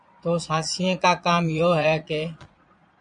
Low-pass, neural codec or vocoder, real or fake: 9.9 kHz; vocoder, 22.05 kHz, 80 mel bands, Vocos; fake